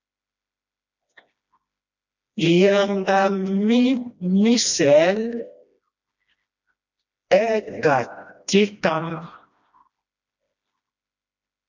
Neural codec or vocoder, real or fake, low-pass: codec, 16 kHz, 1 kbps, FreqCodec, smaller model; fake; 7.2 kHz